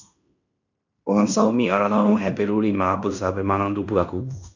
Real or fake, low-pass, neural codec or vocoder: fake; 7.2 kHz; codec, 16 kHz in and 24 kHz out, 0.9 kbps, LongCat-Audio-Codec, fine tuned four codebook decoder